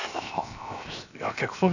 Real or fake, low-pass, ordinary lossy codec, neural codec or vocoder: fake; 7.2 kHz; none; codec, 16 kHz, 0.7 kbps, FocalCodec